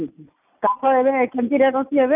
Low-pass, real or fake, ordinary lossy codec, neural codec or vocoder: 3.6 kHz; real; none; none